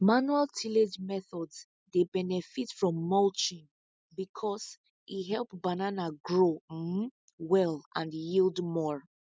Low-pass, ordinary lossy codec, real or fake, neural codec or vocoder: none; none; real; none